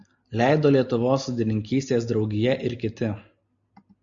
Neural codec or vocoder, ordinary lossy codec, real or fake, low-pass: none; MP3, 96 kbps; real; 7.2 kHz